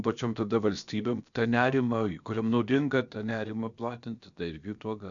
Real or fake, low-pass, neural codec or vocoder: fake; 7.2 kHz; codec, 16 kHz, about 1 kbps, DyCAST, with the encoder's durations